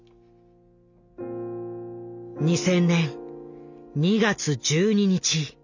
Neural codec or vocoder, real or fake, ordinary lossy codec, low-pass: none; real; none; 7.2 kHz